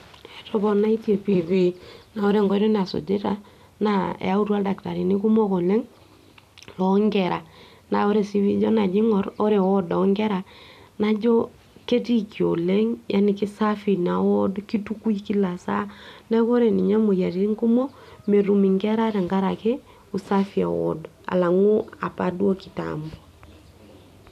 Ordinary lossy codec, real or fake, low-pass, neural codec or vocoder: none; fake; 14.4 kHz; vocoder, 44.1 kHz, 128 mel bands every 256 samples, BigVGAN v2